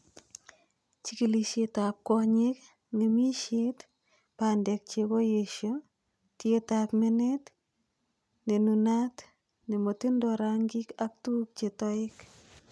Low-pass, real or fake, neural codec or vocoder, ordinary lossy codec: none; real; none; none